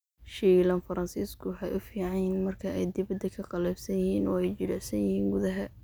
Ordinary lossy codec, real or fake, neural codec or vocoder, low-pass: none; real; none; none